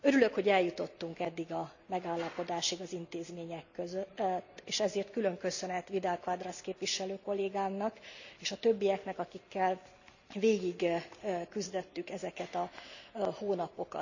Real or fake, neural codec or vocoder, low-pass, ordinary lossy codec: real; none; 7.2 kHz; none